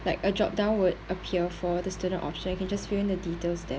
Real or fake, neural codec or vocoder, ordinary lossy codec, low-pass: real; none; none; none